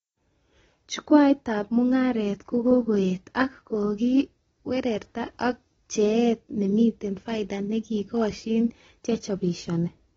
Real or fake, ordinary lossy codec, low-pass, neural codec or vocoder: real; AAC, 24 kbps; 14.4 kHz; none